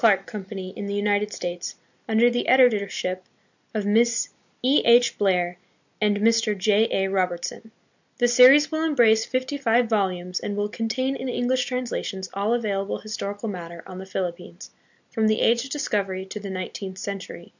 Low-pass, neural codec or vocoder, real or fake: 7.2 kHz; none; real